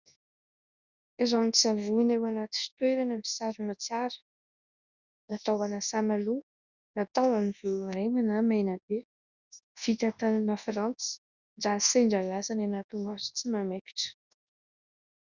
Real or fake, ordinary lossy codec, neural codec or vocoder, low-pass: fake; Opus, 64 kbps; codec, 24 kHz, 0.9 kbps, WavTokenizer, large speech release; 7.2 kHz